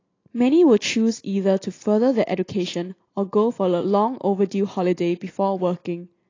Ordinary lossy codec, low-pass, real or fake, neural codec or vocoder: AAC, 32 kbps; 7.2 kHz; real; none